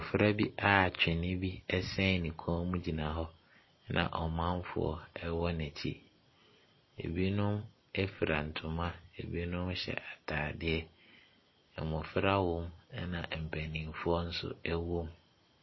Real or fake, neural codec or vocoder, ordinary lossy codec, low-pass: real; none; MP3, 24 kbps; 7.2 kHz